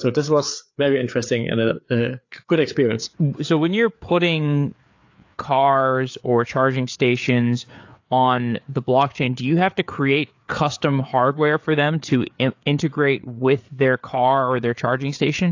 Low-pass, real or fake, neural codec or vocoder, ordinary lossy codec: 7.2 kHz; fake; codec, 16 kHz, 4 kbps, FreqCodec, larger model; AAC, 48 kbps